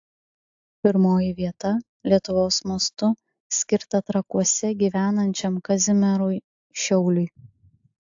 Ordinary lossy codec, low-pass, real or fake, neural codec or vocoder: AAC, 64 kbps; 7.2 kHz; real; none